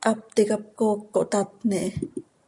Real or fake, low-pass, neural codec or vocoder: real; 10.8 kHz; none